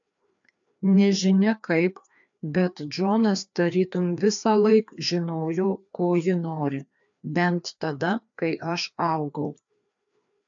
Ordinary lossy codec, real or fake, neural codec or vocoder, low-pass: AAC, 64 kbps; fake; codec, 16 kHz, 2 kbps, FreqCodec, larger model; 7.2 kHz